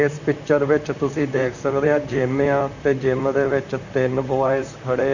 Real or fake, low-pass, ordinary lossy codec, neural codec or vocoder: fake; 7.2 kHz; none; vocoder, 44.1 kHz, 128 mel bands, Pupu-Vocoder